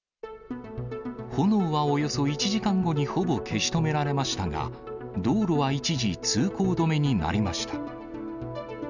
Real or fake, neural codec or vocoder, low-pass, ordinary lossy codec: real; none; 7.2 kHz; none